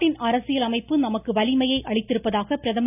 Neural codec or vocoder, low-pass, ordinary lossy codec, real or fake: none; 3.6 kHz; none; real